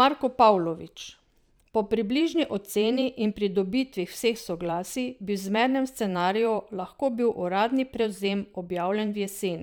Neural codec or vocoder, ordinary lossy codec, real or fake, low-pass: vocoder, 44.1 kHz, 128 mel bands every 512 samples, BigVGAN v2; none; fake; none